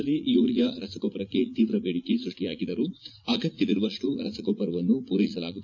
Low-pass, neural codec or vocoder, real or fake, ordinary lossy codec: 7.2 kHz; vocoder, 44.1 kHz, 80 mel bands, Vocos; fake; none